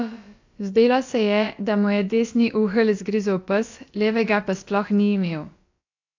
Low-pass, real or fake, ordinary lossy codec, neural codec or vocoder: 7.2 kHz; fake; AAC, 48 kbps; codec, 16 kHz, about 1 kbps, DyCAST, with the encoder's durations